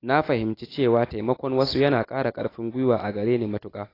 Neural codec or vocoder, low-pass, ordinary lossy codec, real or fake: none; 5.4 kHz; AAC, 24 kbps; real